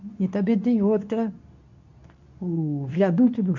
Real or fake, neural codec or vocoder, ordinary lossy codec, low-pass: fake; codec, 24 kHz, 0.9 kbps, WavTokenizer, medium speech release version 2; none; 7.2 kHz